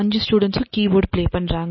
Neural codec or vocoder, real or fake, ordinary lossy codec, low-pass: none; real; MP3, 24 kbps; 7.2 kHz